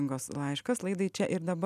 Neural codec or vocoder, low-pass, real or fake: none; 14.4 kHz; real